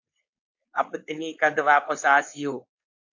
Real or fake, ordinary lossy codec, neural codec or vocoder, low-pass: fake; AAC, 48 kbps; codec, 16 kHz, 4.8 kbps, FACodec; 7.2 kHz